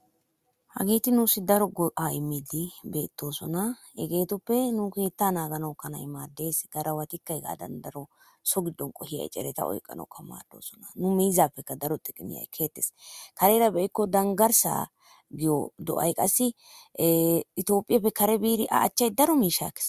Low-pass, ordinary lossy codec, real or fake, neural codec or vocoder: 14.4 kHz; Opus, 64 kbps; real; none